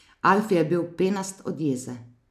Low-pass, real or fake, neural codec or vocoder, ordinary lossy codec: 14.4 kHz; real; none; none